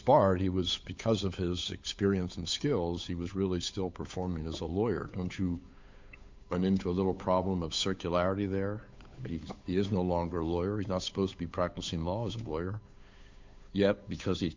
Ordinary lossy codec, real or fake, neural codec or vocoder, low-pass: MP3, 64 kbps; fake; codec, 16 kHz, 8 kbps, FunCodec, trained on Chinese and English, 25 frames a second; 7.2 kHz